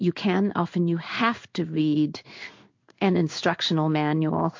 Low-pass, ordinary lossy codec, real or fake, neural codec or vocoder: 7.2 kHz; MP3, 48 kbps; fake; codec, 16 kHz in and 24 kHz out, 1 kbps, XY-Tokenizer